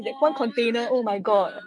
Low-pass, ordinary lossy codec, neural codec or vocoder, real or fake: 9.9 kHz; none; autoencoder, 48 kHz, 128 numbers a frame, DAC-VAE, trained on Japanese speech; fake